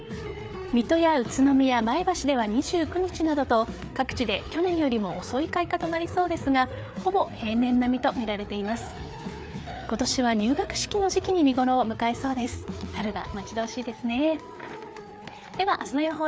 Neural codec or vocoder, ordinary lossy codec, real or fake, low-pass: codec, 16 kHz, 4 kbps, FreqCodec, larger model; none; fake; none